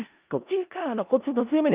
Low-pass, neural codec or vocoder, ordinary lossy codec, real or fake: 3.6 kHz; codec, 16 kHz in and 24 kHz out, 0.4 kbps, LongCat-Audio-Codec, four codebook decoder; Opus, 24 kbps; fake